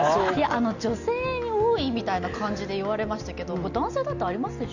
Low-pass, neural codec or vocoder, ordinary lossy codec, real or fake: 7.2 kHz; none; none; real